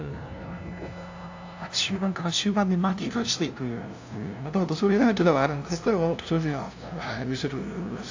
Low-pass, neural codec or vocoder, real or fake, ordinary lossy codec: 7.2 kHz; codec, 16 kHz, 0.5 kbps, FunCodec, trained on LibriTTS, 25 frames a second; fake; AAC, 48 kbps